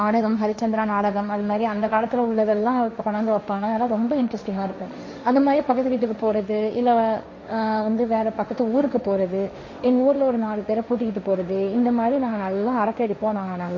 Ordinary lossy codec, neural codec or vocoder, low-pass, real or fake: MP3, 32 kbps; codec, 16 kHz, 1.1 kbps, Voila-Tokenizer; 7.2 kHz; fake